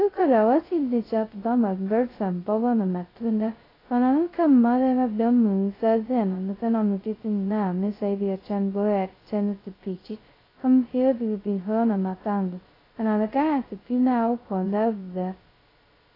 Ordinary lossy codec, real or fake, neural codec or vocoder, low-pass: AAC, 24 kbps; fake; codec, 16 kHz, 0.2 kbps, FocalCodec; 5.4 kHz